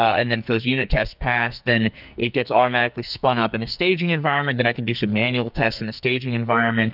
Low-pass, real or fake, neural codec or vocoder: 5.4 kHz; fake; codec, 44.1 kHz, 2.6 kbps, SNAC